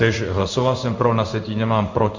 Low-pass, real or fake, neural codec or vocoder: 7.2 kHz; fake; codec, 16 kHz in and 24 kHz out, 1 kbps, XY-Tokenizer